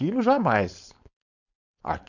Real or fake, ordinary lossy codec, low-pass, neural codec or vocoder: fake; none; 7.2 kHz; codec, 16 kHz, 4.8 kbps, FACodec